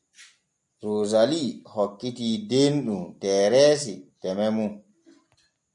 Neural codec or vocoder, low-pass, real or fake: none; 10.8 kHz; real